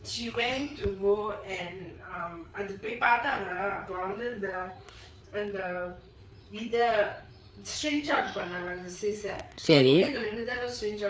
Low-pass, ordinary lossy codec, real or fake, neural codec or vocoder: none; none; fake; codec, 16 kHz, 4 kbps, FreqCodec, larger model